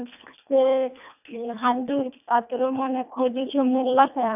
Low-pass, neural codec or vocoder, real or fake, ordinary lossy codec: 3.6 kHz; codec, 24 kHz, 1.5 kbps, HILCodec; fake; none